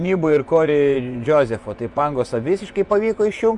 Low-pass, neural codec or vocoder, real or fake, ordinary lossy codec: 10.8 kHz; vocoder, 24 kHz, 100 mel bands, Vocos; fake; MP3, 96 kbps